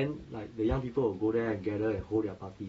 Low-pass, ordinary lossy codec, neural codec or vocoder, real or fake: 19.8 kHz; AAC, 24 kbps; none; real